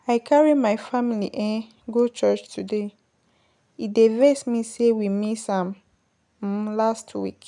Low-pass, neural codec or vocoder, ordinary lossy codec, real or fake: 10.8 kHz; none; none; real